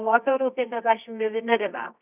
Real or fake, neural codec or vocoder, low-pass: fake; codec, 24 kHz, 0.9 kbps, WavTokenizer, medium music audio release; 3.6 kHz